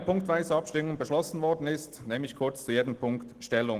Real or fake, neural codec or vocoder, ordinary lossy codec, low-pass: real; none; Opus, 16 kbps; 14.4 kHz